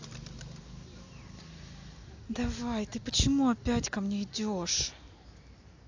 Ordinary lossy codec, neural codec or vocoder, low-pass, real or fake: AAC, 48 kbps; none; 7.2 kHz; real